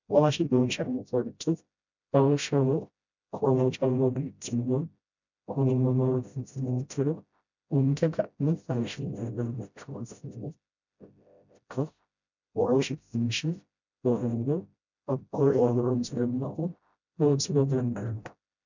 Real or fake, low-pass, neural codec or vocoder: fake; 7.2 kHz; codec, 16 kHz, 0.5 kbps, FreqCodec, smaller model